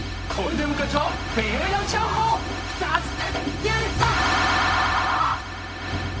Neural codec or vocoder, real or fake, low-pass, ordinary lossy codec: codec, 16 kHz, 0.4 kbps, LongCat-Audio-Codec; fake; none; none